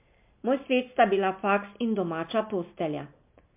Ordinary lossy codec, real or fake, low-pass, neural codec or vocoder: MP3, 32 kbps; real; 3.6 kHz; none